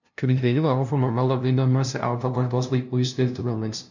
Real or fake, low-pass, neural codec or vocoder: fake; 7.2 kHz; codec, 16 kHz, 0.5 kbps, FunCodec, trained on LibriTTS, 25 frames a second